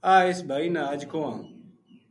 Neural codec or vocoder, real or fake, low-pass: none; real; 10.8 kHz